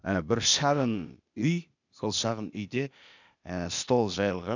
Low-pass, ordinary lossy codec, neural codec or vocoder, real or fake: 7.2 kHz; none; codec, 16 kHz, 0.8 kbps, ZipCodec; fake